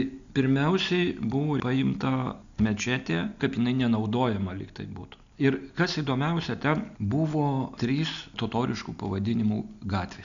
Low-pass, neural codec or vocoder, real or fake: 7.2 kHz; none; real